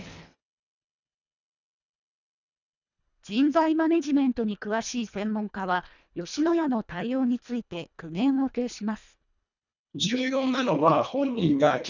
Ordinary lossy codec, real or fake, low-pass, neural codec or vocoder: none; fake; 7.2 kHz; codec, 24 kHz, 1.5 kbps, HILCodec